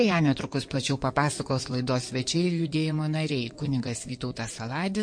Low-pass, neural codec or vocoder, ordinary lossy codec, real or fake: 9.9 kHz; codec, 16 kHz in and 24 kHz out, 2.2 kbps, FireRedTTS-2 codec; MP3, 48 kbps; fake